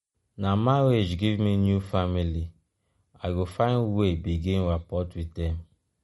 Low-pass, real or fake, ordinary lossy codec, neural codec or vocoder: 14.4 kHz; real; MP3, 48 kbps; none